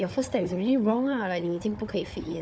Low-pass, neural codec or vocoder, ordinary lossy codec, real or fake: none; codec, 16 kHz, 4 kbps, FunCodec, trained on Chinese and English, 50 frames a second; none; fake